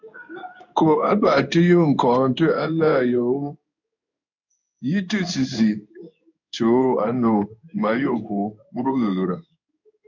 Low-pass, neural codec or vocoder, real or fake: 7.2 kHz; codec, 16 kHz in and 24 kHz out, 1 kbps, XY-Tokenizer; fake